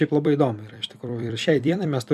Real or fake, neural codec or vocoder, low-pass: fake; vocoder, 44.1 kHz, 128 mel bands every 256 samples, BigVGAN v2; 14.4 kHz